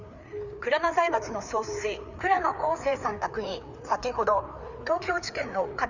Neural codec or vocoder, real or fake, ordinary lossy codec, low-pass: codec, 16 kHz, 4 kbps, FreqCodec, larger model; fake; none; 7.2 kHz